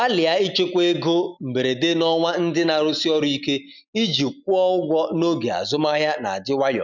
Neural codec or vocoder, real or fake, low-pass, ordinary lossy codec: none; real; 7.2 kHz; none